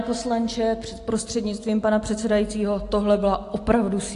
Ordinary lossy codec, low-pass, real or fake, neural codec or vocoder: AAC, 48 kbps; 10.8 kHz; real; none